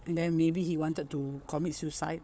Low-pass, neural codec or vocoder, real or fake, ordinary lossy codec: none; codec, 16 kHz, 4 kbps, FunCodec, trained on Chinese and English, 50 frames a second; fake; none